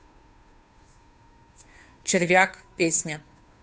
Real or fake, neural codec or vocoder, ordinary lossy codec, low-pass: fake; codec, 16 kHz, 2 kbps, FunCodec, trained on Chinese and English, 25 frames a second; none; none